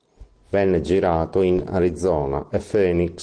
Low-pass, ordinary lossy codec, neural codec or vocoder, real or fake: 9.9 kHz; Opus, 16 kbps; none; real